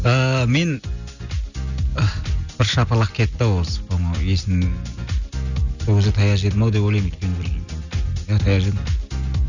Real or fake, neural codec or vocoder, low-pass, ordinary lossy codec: real; none; 7.2 kHz; none